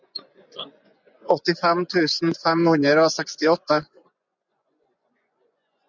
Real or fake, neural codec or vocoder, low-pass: fake; vocoder, 24 kHz, 100 mel bands, Vocos; 7.2 kHz